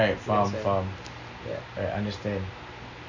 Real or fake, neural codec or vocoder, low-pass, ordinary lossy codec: real; none; 7.2 kHz; none